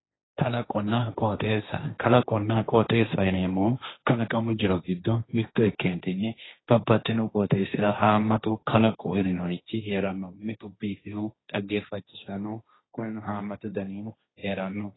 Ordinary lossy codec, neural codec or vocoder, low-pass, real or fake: AAC, 16 kbps; codec, 16 kHz, 1.1 kbps, Voila-Tokenizer; 7.2 kHz; fake